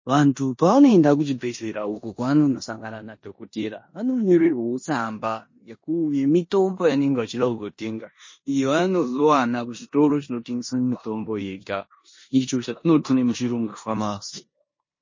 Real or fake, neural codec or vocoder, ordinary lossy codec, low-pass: fake; codec, 16 kHz in and 24 kHz out, 0.9 kbps, LongCat-Audio-Codec, four codebook decoder; MP3, 32 kbps; 7.2 kHz